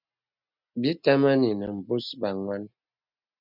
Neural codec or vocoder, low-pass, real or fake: none; 5.4 kHz; real